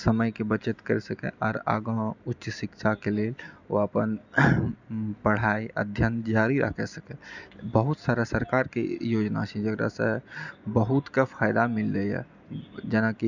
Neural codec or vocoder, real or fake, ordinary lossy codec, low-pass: none; real; none; 7.2 kHz